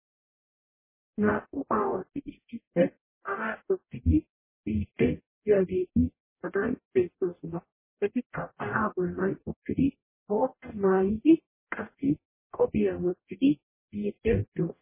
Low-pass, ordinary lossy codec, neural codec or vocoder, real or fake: 3.6 kHz; MP3, 16 kbps; codec, 44.1 kHz, 0.9 kbps, DAC; fake